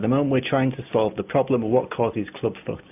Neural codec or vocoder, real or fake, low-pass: none; real; 3.6 kHz